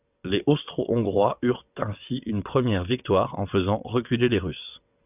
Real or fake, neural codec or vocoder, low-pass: fake; vocoder, 22.05 kHz, 80 mel bands, WaveNeXt; 3.6 kHz